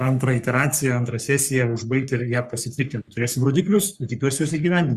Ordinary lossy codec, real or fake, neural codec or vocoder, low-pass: Opus, 64 kbps; fake; codec, 44.1 kHz, 3.4 kbps, Pupu-Codec; 14.4 kHz